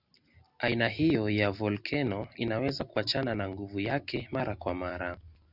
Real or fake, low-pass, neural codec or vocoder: real; 5.4 kHz; none